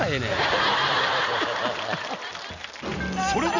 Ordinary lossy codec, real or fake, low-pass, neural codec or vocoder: none; real; 7.2 kHz; none